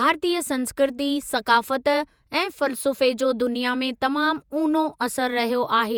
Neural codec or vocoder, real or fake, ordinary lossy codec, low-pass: vocoder, 48 kHz, 128 mel bands, Vocos; fake; none; none